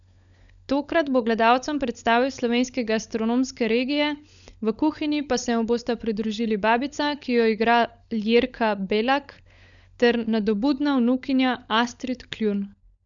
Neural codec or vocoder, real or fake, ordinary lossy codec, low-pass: codec, 16 kHz, 16 kbps, FunCodec, trained on LibriTTS, 50 frames a second; fake; none; 7.2 kHz